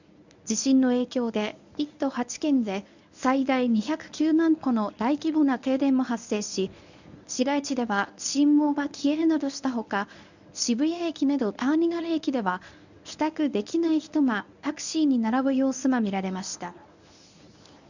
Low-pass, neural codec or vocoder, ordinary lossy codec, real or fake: 7.2 kHz; codec, 24 kHz, 0.9 kbps, WavTokenizer, medium speech release version 1; none; fake